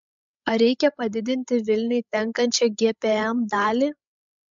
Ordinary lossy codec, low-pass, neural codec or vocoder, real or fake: MP3, 64 kbps; 7.2 kHz; codec, 16 kHz, 16 kbps, FreqCodec, larger model; fake